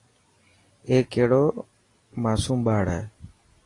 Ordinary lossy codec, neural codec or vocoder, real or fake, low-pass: AAC, 32 kbps; none; real; 10.8 kHz